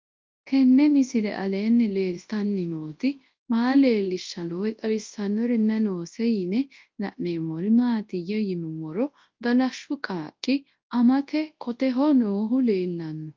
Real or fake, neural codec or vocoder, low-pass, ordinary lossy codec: fake; codec, 24 kHz, 0.9 kbps, WavTokenizer, large speech release; 7.2 kHz; Opus, 32 kbps